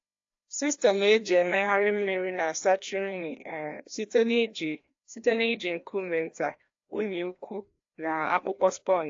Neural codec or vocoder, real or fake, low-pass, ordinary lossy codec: codec, 16 kHz, 1 kbps, FreqCodec, larger model; fake; 7.2 kHz; AAC, 48 kbps